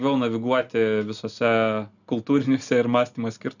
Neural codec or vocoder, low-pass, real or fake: none; 7.2 kHz; real